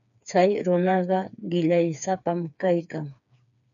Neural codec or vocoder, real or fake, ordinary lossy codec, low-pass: codec, 16 kHz, 4 kbps, FreqCodec, smaller model; fake; MP3, 96 kbps; 7.2 kHz